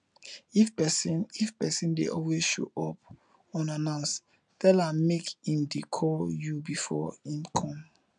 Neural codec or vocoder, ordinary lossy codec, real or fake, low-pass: none; none; real; 9.9 kHz